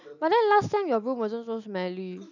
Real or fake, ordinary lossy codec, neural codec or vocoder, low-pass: real; none; none; 7.2 kHz